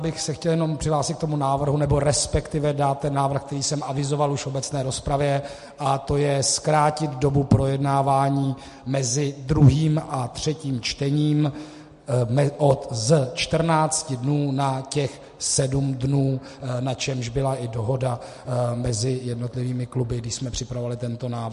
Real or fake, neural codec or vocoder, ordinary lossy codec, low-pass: real; none; MP3, 48 kbps; 14.4 kHz